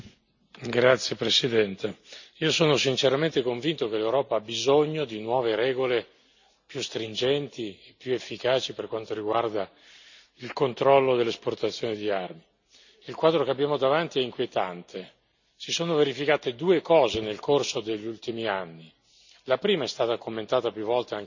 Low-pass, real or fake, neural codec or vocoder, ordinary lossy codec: 7.2 kHz; real; none; none